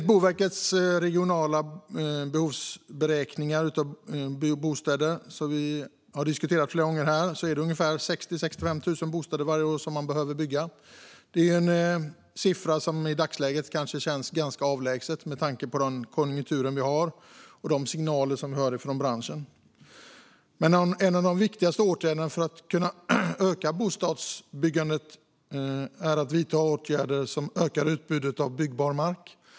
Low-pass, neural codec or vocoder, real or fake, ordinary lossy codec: none; none; real; none